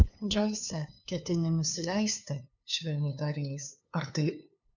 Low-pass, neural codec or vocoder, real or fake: 7.2 kHz; codec, 16 kHz, 2 kbps, FunCodec, trained on LibriTTS, 25 frames a second; fake